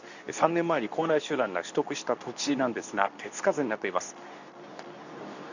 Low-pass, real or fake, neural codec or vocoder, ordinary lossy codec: 7.2 kHz; fake; codec, 24 kHz, 0.9 kbps, WavTokenizer, medium speech release version 2; none